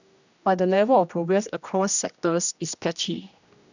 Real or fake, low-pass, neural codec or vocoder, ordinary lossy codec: fake; 7.2 kHz; codec, 16 kHz, 1 kbps, X-Codec, HuBERT features, trained on general audio; none